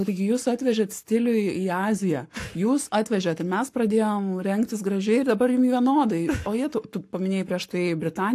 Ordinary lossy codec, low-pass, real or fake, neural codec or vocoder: AAC, 64 kbps; 14.4 kHz; fake; codec, 44.1 kHz, 7.8 kbps, Pupu-Codec